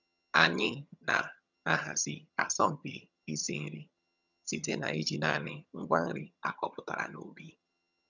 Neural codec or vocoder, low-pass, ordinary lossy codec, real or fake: vocoder, 22.05 kHz, 80 mel bands, HiFi-GAN; 7.2 kHz; none; fake